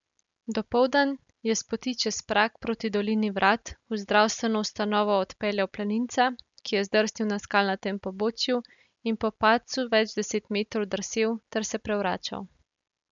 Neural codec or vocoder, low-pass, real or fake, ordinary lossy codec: none; 7.2 kHz; real; MP3, 96 kbps